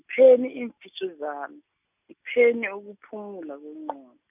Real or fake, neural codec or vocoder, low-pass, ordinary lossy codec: real; none; 3.6 kHz; none